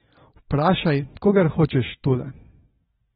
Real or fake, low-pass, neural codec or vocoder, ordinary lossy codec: real; 19.8 kHz; none; AAC, 16 kbps